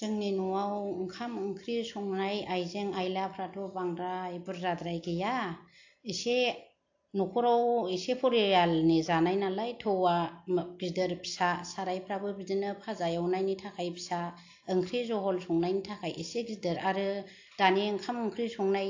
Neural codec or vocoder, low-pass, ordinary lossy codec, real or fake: none; 7.2 kHz; AAC, 48 kbps; real